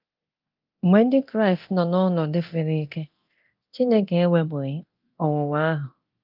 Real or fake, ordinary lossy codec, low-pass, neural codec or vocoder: fake; Opus, 24 kbps; 5.4 kHz; codec, 24 kHz, 0.9 kbps, DualCodec